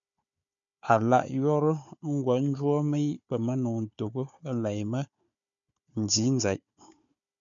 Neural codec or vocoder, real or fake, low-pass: codec, 16 kHz, 4 kbps, FunCodec, trained on Chinese and English, 50 frames a second; fake; 7.2 kHz